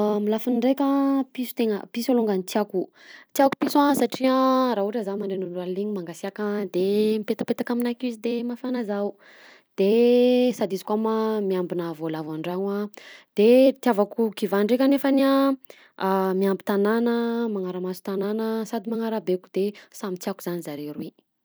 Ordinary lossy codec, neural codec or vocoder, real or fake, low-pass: none; vocoder, 44.1 kHz, 128 mel bands every 256 samples, BigVGAN v2; fake; none